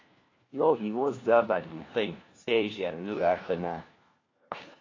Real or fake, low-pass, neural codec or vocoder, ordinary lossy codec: fake; 7.2 kHz; codec, 16 kHz, 1 kbps, FunCodec, trained on LibriTTS, 50 frames a second; AAC, 32 kbps